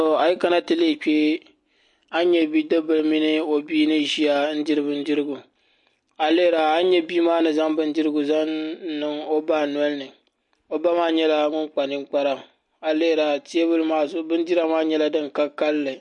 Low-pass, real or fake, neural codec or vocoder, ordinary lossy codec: 10.8 kHz; real; none; MP3, 48 kbps